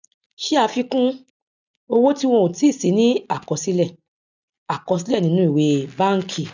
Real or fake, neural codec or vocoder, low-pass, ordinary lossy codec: real; none; 7.2 kHz; none